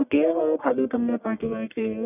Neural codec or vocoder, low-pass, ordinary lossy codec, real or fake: codec, 44.1 kHz, 1.7 kbps, Pupu-Codec; 3.6 kHz; none; fake